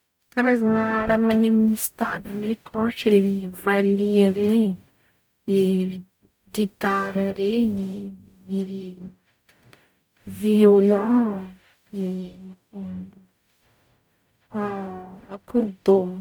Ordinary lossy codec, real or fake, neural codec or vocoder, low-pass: none; fake; codec, 44.1 kHz, 0.9 kbps, DAC; none